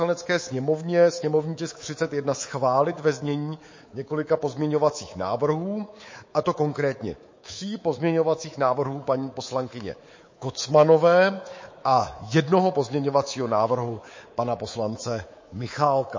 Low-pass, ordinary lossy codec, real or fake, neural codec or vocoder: 7.2 kHz; MP3, 32 kbps; fake; codec, 24 kHz, 3.1 kbps, DualCodec